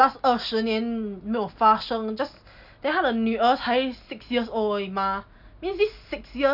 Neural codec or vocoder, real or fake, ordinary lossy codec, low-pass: none; real; none; 5.4 kHz